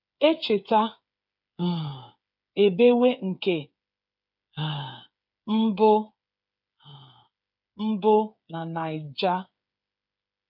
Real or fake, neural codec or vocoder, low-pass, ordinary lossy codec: fake; codec, 16 kHz, 8 kbps, FreqCodec, smaller model; 5.4 kHz; none